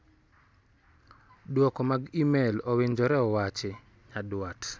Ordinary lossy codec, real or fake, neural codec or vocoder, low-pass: none; real; none; none